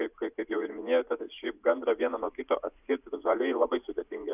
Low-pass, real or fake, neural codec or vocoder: 3.6 kHz; fake; vocoder, 44.1 kHz, 80 mel bands, Vocos